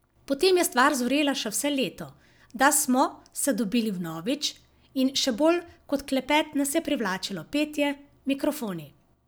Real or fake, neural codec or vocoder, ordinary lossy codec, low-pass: real; none; none; none